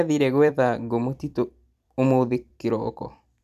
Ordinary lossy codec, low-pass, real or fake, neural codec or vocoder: none; 14.4 kHz; fake; vocoder, 44.1 kHz, 128 mel bands every 256 samples, BigVGAN v2